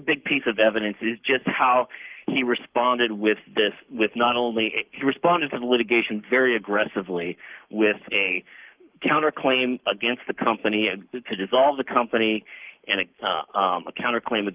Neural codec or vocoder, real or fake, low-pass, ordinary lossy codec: codec, 44.1 kHz, 7.8 kbps, Pupu-Codec; fake; 3.6 kHz; Opus, 32 kbps